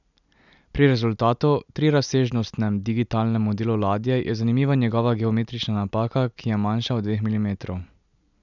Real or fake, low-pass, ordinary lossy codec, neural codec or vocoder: real; 7.2 kHz; none; none